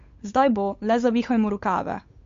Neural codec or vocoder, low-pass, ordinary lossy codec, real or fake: codec, 16 kHz, 8 kbps, FunCodec, trained on Chinese and English, 25 frames a second; 7.2 kHz; MP3, 48 kbps; fake